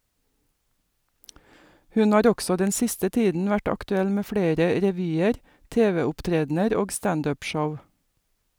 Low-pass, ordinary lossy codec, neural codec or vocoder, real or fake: none; none; none; real